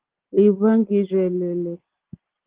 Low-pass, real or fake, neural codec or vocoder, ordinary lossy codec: 3.6 kHz; real; none; Opus, 16 kbps